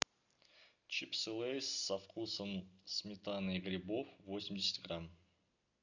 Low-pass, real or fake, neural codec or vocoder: 7.2 kHz; real; none